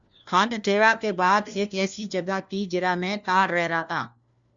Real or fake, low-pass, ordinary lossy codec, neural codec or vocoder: fake; 7.2 kHz; Opus, 64 kbps; codec, 16 kHz, 1 kbps, FunCodec, trained on LibriTTS, 50 frames a second